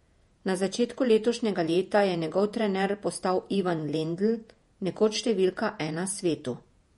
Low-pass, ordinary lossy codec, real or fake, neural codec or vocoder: 19.8 kHz; MP3, 48 kbps; fake; vocoder, 48 kHz, 128 mel bands, Vocos